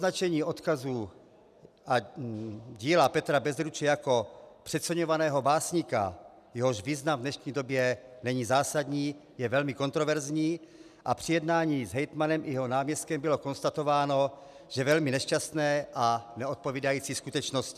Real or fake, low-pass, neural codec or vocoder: real; 14.4 kHz; none